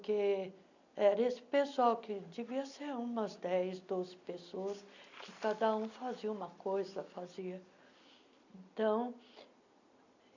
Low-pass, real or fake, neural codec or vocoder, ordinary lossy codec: 7.2 kHz; real; none; none